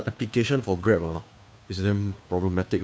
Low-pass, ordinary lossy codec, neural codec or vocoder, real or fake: none; none; codec, 16 kHz, 2 kbps, FunCodec, trained on Chinese and English, 25 frames a second; fake